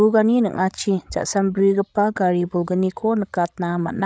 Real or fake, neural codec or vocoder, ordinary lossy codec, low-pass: fake; codec, 16 kHz, 16 kbps, FreqCodec, larger model; none; none